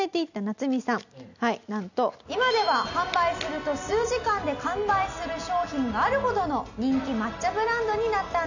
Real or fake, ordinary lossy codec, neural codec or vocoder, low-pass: real; none; none; 7.2 kHz